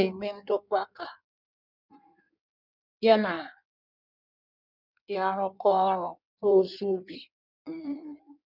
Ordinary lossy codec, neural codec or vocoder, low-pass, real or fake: none; codec, 16 kHz in and 24 kHz out, 1.1 kbps, FireRedTTS-2 codec; 5.4 kHz; fake